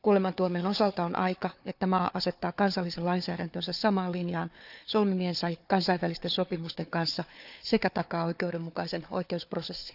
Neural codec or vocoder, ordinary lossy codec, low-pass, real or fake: codec, 16 kHz, 4 kbps, FunCodec, trained on Chinese and English, 50 frames a second; none; 5.4 kHz; fake